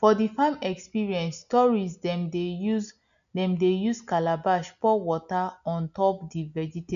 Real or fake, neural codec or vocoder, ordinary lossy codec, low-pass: real; none; none; 7.2 kHz